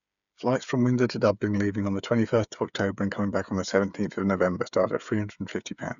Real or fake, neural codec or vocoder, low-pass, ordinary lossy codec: fake; codec, 16 kHz, 8 kbps, FreqCodec, smaller model; 7.2 kHz; none